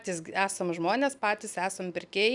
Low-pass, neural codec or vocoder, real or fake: 10.8 kHz; none; real